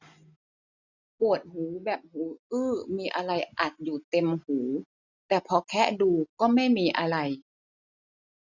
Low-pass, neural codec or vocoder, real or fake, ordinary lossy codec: 7.2 kHz; none; real; none